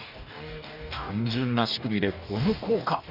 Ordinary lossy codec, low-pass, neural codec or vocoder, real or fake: none; 5.4 kHz; codec, 44.1 kHz, 2.6 kbps, DAC; fake